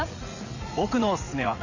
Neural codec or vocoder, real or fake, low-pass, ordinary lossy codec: vocoder, 44.1 kHz, 80 mel bands, Vocos; fake; 7.2 kHz; none